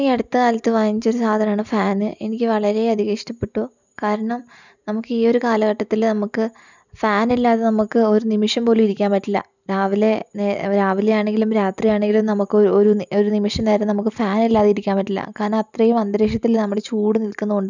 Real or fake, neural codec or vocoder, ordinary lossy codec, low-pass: real; none; none; 7.2 kHz